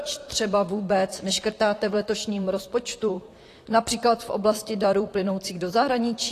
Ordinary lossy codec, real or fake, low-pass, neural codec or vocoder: AAC, 48 kbps; fake; 14.4 kHz; vocoder, 44.1 kHz, 128 mel bands, Pupu-Vocoder